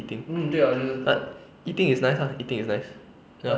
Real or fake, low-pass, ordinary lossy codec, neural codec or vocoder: real; none; none; none